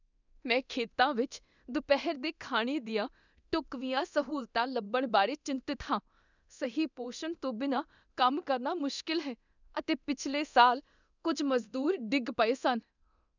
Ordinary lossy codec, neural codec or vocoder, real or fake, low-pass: none; codec, 24 kHz, 0.9 kbps, DualCodec; fake; 7.2 kHz